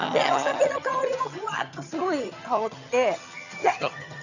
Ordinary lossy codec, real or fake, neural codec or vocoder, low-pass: none; fake; vocoder, 22.05 kHz, 80 mel bands, HiFi-GAN; 7.2 kHz